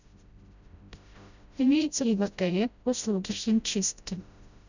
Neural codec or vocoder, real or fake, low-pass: codec, 16 kHz, 0.5 kbps, FreqCodec, smaller model; fake; 7.2 kHz